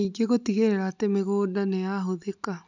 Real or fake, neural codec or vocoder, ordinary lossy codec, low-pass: real; none; none; 7.2 kHz